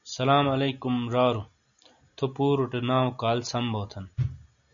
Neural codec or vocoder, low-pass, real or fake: none; 7.2 kHz; real